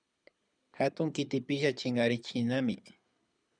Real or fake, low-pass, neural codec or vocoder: fake; 9.9 kHz; codec, 24 kHz, 6 kbps, HILCodec